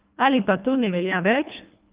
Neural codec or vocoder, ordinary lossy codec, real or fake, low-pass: codec, 24 kHz, 1.5 kbps, HILCodec; Opus, 32 kbps; fake; 3.6 kHz